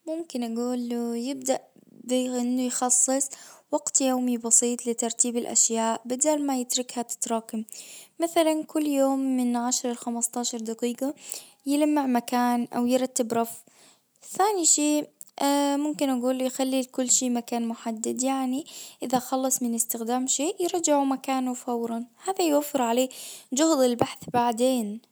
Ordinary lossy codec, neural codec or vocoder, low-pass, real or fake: none; none; none; real